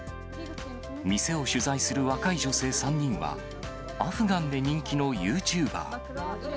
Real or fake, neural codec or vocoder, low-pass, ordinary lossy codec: real; none; none; none